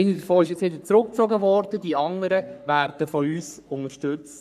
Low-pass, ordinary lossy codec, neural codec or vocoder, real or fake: 14.4 kHz; none; codec, 44.1 kHz, 3.4 kbps, Pupu-Codec; fake